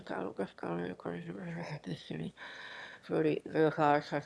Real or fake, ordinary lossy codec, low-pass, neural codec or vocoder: fake; none; none; autoencoder, 22.05 kHz, a latent of 192 numbers a frame, VITS, trained on one speaker